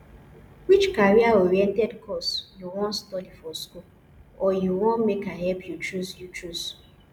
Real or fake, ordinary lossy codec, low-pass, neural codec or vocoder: real; none; 19.8 kHz; none